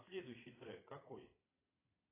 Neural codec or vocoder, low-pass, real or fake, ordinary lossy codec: vocoder, 22.05 kHz, 80 mel bands, Vocos; 3.6 kHz; fake; AAC, 16 kbps